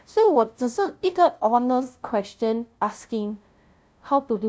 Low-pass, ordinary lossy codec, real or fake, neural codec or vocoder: none; none; fake; codec, 16 kHz, 0.5 kbps, FunCodec, trained on LibriTTS, 25 frames a second